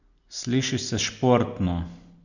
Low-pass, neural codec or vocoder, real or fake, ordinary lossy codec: 7.2 kHz; none; real; none